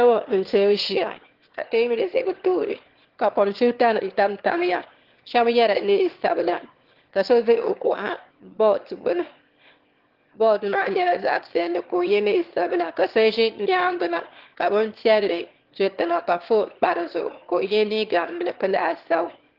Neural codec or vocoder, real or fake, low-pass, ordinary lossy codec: autoencoder, 22.05 kHz, a latent of 192 numbers a frame, VITS, trained on one speaker; fake; 5.4 kHz; Opus, 16 kbps